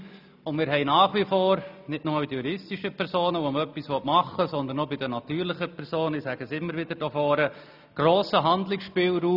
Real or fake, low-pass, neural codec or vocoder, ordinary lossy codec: real; 5.4 kHz; none; none